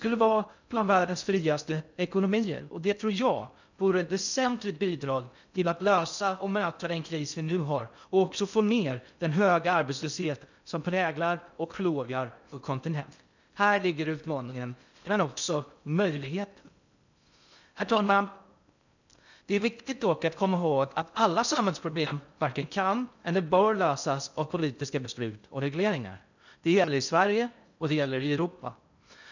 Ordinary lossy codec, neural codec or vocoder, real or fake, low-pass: none; codec, 16 kHz in and 24 kHz out, 0.6 kbps, FocalCodec, streaming, 2048 codes; fake; 7.2 kHz